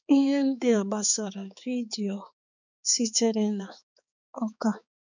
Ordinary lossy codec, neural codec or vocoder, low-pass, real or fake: none; codec, 16 kHz, 4 kbps, X-Codec, HuBERT features, trained on balanced general audio; 7.2 kHz; fake